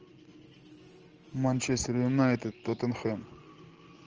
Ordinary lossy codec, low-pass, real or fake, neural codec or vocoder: Opus, 24 kbps; 7.2 kHz; real; none